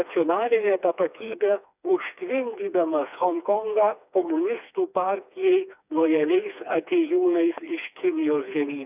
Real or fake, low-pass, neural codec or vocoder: fake; 3.6 kHz; codec, 16 kHz, 2 kbps, FreqCodec, smaller model